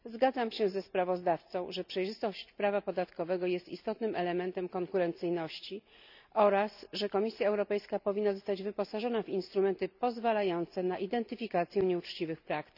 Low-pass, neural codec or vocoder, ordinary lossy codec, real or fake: 5.4 kHz; none; none; real